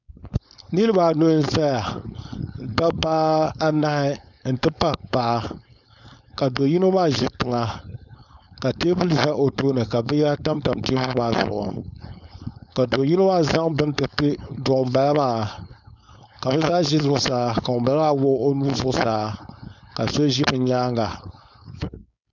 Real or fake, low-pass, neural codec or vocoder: fake; 7.2 kHz; codec, 16 kHz, 4.8 kbps, FACodec